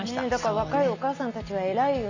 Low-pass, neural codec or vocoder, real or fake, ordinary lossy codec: 7.2 kHz; none; real; none